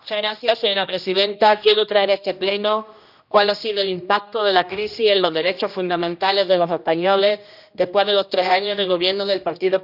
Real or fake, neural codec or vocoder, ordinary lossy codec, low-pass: fake; codec, 16 kHz, 1 kbps, X-Codec, HuBERT features, trained on general audio; none; 5.4 kHz